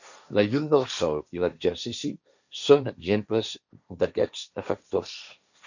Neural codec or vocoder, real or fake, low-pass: codec, 16 kHz, 1.1 kbps, Voila-Tokenizer; fake; 7.2 kHz